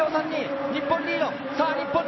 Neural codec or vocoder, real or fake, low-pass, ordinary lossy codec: none; real; 7.2 kHz; MP3, 24 kbps